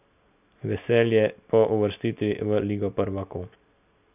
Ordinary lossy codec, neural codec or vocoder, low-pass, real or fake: none; none; 3.6 kHz; real